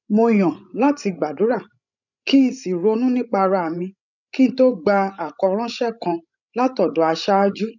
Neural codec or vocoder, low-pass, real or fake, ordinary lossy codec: codec, 16 kHz, 16 kbps, FreqCodec, larger model; 7.2 kHz; fake; none